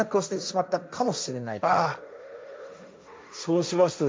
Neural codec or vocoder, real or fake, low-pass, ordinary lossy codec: codec, 16 kHz, 1.1 kbps, Voila-Tokenizer; fake; none; none